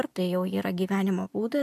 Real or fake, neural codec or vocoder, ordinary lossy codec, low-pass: real; none; AAC, 96 kbps; 14.4 kHz